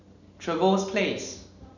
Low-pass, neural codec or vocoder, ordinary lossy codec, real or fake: 7.2 kHz; none; none; real